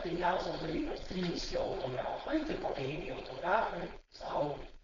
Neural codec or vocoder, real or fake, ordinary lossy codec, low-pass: codec, 16 kHz, 4.8 kbps, FACodec; fake; MP3, 64 kbps; 7.2 kHz